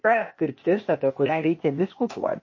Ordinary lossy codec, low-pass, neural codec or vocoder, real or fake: MP3, 32 kbps; 7.2 kHz; codec, 16 kHz, 0.8 kbps, ZipCodec; fake